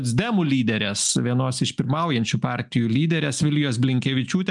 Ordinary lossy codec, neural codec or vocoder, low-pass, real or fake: MP3, 96 kbps; none; 10.8 kHz; real